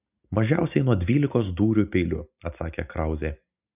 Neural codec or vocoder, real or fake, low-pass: none; real; 3.6 kHz